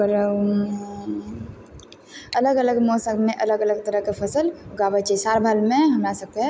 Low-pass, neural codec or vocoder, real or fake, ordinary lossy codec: none; none; real; none